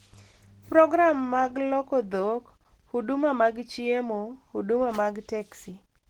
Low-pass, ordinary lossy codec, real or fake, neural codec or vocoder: 19.8 kHz; Opus, 16 kbps; real; none